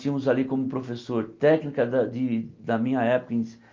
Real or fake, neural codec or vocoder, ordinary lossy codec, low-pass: real; none; Opus, 24 kbps; 7.2 kHz